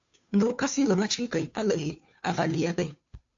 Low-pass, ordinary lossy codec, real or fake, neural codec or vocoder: 7.2 kHz; AAC, 64 kbps; fake; codec, 16 kHz, 2 kbps, FunCodec, trained on Chinese and English, 25 frames a second